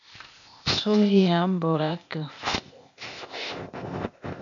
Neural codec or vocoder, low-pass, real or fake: codec, 16 kHz, 0.8 kbps, ZipCodec; 7.2 kHz; fake